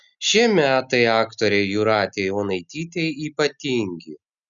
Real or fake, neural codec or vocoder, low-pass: real; none; 7.2 kHz